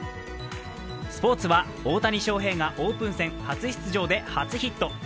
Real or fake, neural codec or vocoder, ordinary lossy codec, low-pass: real; none; none; none